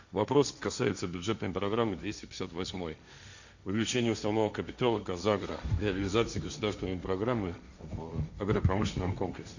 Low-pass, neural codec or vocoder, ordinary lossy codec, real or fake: 7.2 kHz; codec, 16 kHz, 1.1 kbps, Voila-Tokenizer; none; fake